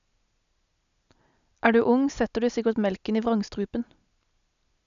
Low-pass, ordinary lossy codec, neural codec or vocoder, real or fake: 7.2 kHz; none; none; real